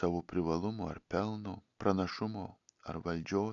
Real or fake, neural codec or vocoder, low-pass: real; none; 7.2 kHz